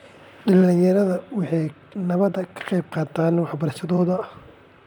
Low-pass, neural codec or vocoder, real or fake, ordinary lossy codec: 19.8 kHz; vocoder, 44.1 kHz, 128 mel bands, Pupu-Vocoder; fake; none